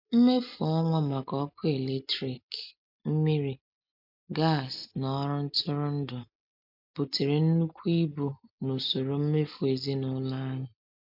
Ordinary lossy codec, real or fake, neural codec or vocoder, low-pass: MP3, 48 kbps; real; none; 5.4 kHz